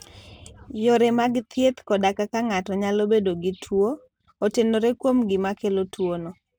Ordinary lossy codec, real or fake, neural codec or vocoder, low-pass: none; real; none; none